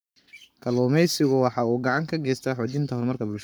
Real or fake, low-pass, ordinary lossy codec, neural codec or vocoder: fake; none; none; codec, 44.1 kHz, 7.8 kbps, Pupu-Codec